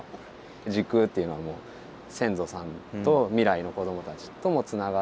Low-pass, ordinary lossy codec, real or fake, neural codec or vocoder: none; none; real; none